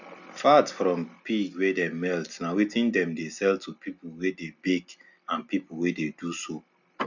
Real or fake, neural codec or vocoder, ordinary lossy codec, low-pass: real; none; none; 7.2 kHz